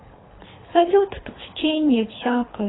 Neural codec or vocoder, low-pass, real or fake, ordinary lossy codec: codec, 24 kHz, 3 kbps, HILCodec; 7.2 kHz; fake; AAC, 16 kbps